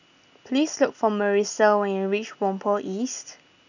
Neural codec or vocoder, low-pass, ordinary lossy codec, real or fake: none; 7.2 kHz; none; real